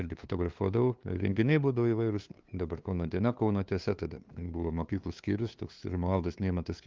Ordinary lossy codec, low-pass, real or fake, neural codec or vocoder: Opus, 32 kbps; 7.2 kHz; fake; codec, 16 kHz, 2 kbps, FunCodec, trained on LibriTTS, 25 frames a second